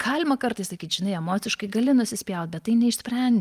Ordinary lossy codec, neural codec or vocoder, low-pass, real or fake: Opus, 32 kbps; none; 14.4 kHz; real